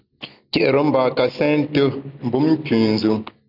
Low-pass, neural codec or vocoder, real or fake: 5.4 kHz; none; real